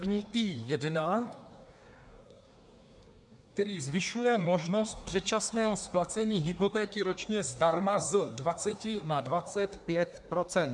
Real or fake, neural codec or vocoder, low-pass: fake; codec, 24 kHz, 1 kbps, SNAC; 10.8 kHz